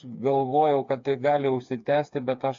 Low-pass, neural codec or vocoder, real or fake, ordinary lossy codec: 7.2 kHz; codec, 16 kHz, 4 kbps, FreqCodec, smaller model; fake; AAC, 64 kbps